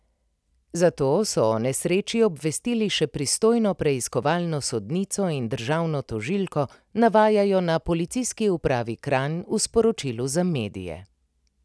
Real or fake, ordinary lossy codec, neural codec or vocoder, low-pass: real; none; none; none